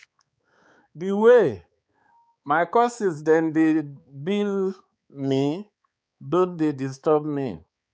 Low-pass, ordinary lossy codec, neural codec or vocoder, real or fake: none; none; codec, 16 kHz, 4 kbps, X-Codec, HuBERT features, trained on balanced general audio; fake